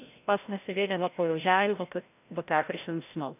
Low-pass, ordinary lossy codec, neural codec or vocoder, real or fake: 3.6 kHz; none; codec, 16 kHz, 0.5 kbps, FreqCodec, larger model; fake